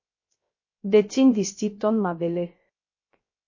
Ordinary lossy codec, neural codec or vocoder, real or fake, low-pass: MP3, 32 kbps; codec, 16 kHz, 0.3 kbps, FocalCodec; fake; 7.2 kHz